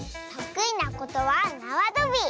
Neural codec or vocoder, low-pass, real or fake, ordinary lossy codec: none; none; real; none